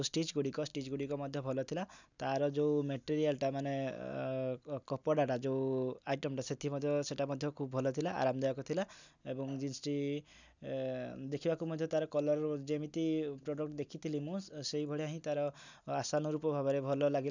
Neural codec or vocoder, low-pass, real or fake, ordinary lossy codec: none; 7.2 kHz; real; none